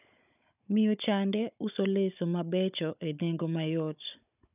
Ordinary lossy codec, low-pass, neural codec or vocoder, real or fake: none; 3.6 kHz; codec, 16 kHz, 16 kbps, FunCodec, trained on Chinese and English, 50 frames a second; fake